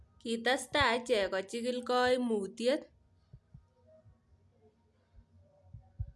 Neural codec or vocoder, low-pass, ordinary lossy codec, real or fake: none; none; none; real